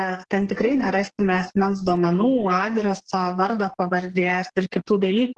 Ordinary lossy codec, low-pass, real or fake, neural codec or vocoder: Opus, 16 kbps; 10.8 kHz; fake; codec, 44.1 kHz, 2.6 kbps, SNAC